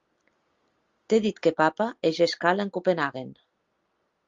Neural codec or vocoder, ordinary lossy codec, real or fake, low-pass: none; Opus, 32 kbps; real; 7.2 kHz